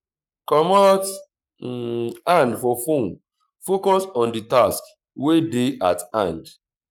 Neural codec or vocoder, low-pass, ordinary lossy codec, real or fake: codec, 44.1 kHz, 7.8 kbps, Pupu-Codec; 19.8 kHz; none; fake